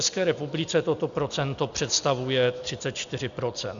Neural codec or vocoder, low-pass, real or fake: none; 7.2 kHz; real